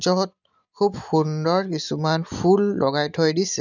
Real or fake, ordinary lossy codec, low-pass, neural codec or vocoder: real; none; 7.2 kHz; none